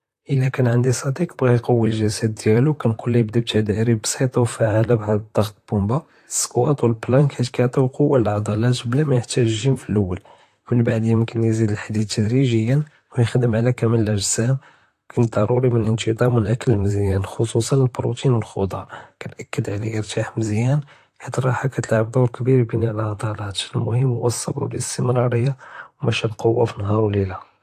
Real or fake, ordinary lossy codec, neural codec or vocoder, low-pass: fake; AAC, 64 kbps; vocoder, 44.1 kHz, 128 mel bands, Pupu-Vocoder; 14.4 kHz